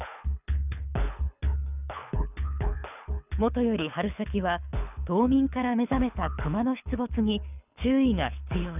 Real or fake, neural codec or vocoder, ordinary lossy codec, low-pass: fake; codec, 24 kHz, 6 kbps, HILCodec; none; 3.6 kHz